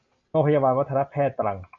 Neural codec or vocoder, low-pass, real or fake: none; 7.2 kHz; real